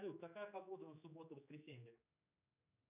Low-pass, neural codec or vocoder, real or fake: 3.6 kHz; codec, 16 kHz, 4 kbps, X-Codec, HuBERT features, trained on general audio; fake